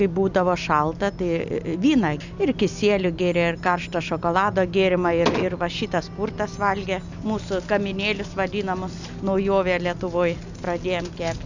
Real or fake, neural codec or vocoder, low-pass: real; none; 7.2 kHz